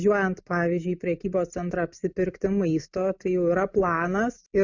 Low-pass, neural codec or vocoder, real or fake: 7.2 kHz; none; real